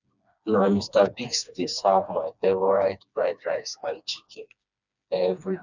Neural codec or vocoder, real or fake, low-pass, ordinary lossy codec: codec, 16 kHz, 2 kbps, FreqCodec, smaller model; fake; 7.2 kHz; none